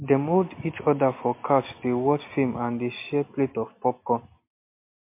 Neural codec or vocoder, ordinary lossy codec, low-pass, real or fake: none; MP3, 24 kbps; 3.6 kHz; real